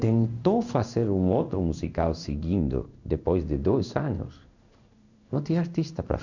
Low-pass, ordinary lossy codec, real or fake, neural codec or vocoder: 7.2 kHz; none; fake; codec, 16 kHz in and 24 kHz out, 1 kbps, XY-Tokenizer